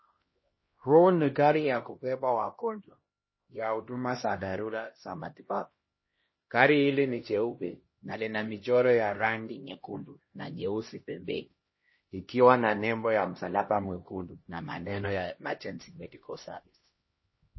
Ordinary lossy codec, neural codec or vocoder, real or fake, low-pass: MP3, 24 kbps; codec, 16 kHz, 1 kbps, X-Codec, HuBERT features, trained on LibriSpeech; fake; 7.2 kHz